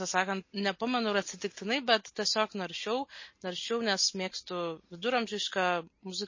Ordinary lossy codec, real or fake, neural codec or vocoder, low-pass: MP3, 32 kbps; real; none; 7.2 kHz